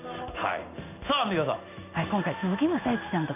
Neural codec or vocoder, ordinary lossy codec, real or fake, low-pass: codec, 16 kHz in and 24 kHz out, 1 kbps, XY-Tokenizer; none; fake; 3.6 kHz